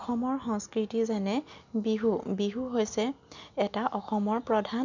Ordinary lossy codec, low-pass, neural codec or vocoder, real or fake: none; 7.2 kHz; none; real